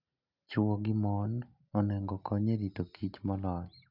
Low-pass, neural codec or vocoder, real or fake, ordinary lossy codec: 5.4 kHz; none; real; none